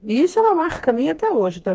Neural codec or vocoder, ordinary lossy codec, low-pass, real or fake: codec, 16 kHz, 2 kbps, FreqCodec, smaller model; none; none; fake